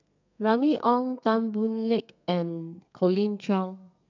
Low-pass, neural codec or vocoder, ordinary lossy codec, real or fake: 7.2 kHz; codec, 32 kHz, 1.9 kbps, SNAC; none; fake